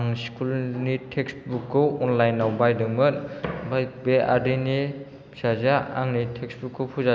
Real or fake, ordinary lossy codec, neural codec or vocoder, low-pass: real; none; none; none